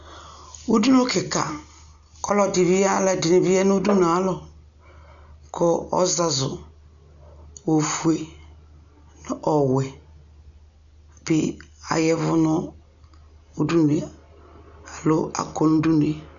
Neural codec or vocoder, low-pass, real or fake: none; 7.2 kHz; real